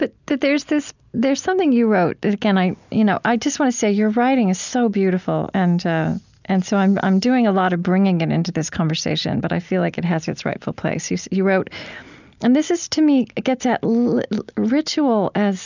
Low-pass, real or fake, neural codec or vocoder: 7.2 kHz; real; none